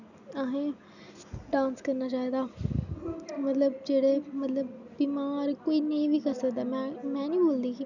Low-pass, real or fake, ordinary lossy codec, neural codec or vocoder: 7.2 kHz; real; none; none